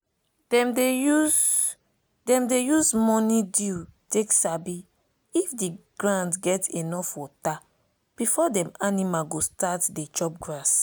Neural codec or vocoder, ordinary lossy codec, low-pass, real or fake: none; none; none; real